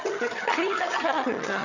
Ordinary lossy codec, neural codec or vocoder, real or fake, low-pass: none; vocoder, 22.05 kHz, 80 mel bands, HiFi-GAN; fake; 7.2 kHz